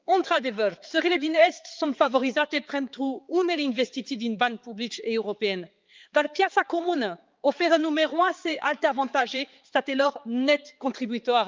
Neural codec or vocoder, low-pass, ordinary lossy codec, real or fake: codec, 16 kHz, 4 kbps, X-Codec, HuBERT features, trained on balanced general audio; 7.2 kHz; Opus, 24 kbps; fake